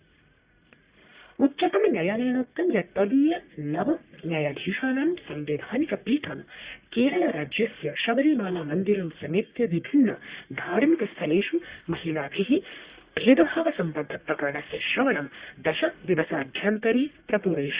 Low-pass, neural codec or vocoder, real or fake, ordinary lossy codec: 3.6 kHz; codec, 44.1 kHz, 1.7 kbps, Pupu-Codec; fake; Opus, 64 kbps